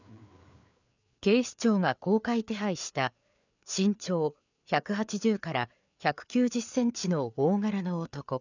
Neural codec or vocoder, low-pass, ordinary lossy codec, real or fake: codec, 16 kHz, 4 kbps, FreqCodec, larger model; 7.2 kHz; none; fake